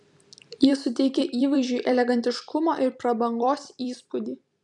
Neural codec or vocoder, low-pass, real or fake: vocoder, 44.1 kHz, 128 mel bands every 256 samples, BigVGAN v2; 10.8 kHz; fake